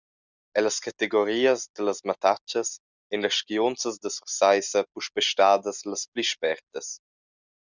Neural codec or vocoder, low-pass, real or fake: none; 7.2 kHz; real